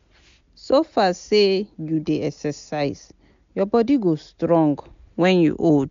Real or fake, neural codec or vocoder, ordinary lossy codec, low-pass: real; none; MP3, 64 kbps; 7.2 kHz